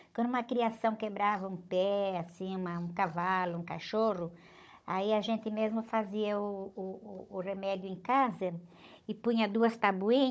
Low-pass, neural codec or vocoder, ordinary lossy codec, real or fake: none; codec, 16 kHz, 16 kbps, FunCodec, trained on Chinese and English, 50 frames a second; none; fake